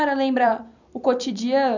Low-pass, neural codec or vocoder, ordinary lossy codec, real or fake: 7.2 kHz; vocoder, 44.1 kHz, 128 mel bands every 256 samples, BigVGAN v2; none; fake